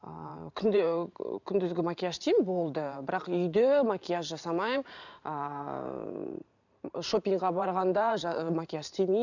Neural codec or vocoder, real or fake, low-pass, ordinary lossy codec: vocoder, 44.1 kHz, 128 mel bands every 512 samples, BigVGAN v2; fake; 7.2 kHz; none